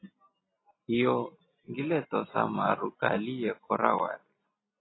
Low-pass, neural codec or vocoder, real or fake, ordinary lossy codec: 7.2 kHz; none; real; AAC, 16 kbps